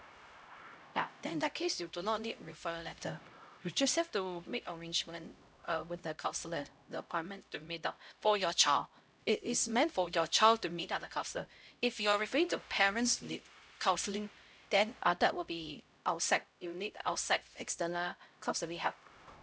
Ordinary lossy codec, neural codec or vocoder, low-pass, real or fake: none; codec, 16 kHz, 0.5 kbps, X-Codec, HuBERT features, trained on LibriSpeech; none; fake